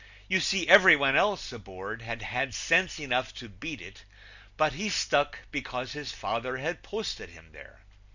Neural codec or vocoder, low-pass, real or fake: none; 7.2 kHz; real